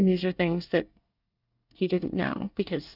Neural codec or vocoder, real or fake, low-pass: codec, 24 kHz, 1 kbps, SNAC; fake; 5.4 kHz